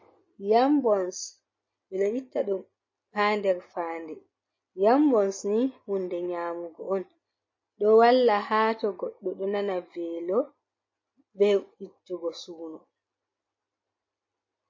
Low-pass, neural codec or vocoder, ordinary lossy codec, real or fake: 7.2 kHz; none; MP3, 32 kbps; real